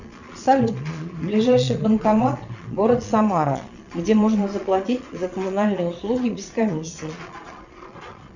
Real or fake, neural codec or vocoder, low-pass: fake; vocoder, 22.05 kHz, 80 mel bands, Vocos; 7.2 kHz